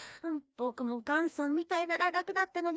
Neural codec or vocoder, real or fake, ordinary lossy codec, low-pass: codec, 16 kHz, 1 kbps, FreqCodec, larger model; fake; none; none